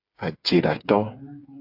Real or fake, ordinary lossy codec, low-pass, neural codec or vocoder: fake; AAC, 48 kbps; 5.4 kHz; codec, 16 kHz, 8 kbps, FreqCodec, smaller model